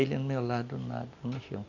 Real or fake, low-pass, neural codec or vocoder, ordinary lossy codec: real; 7.2 kHz; none; none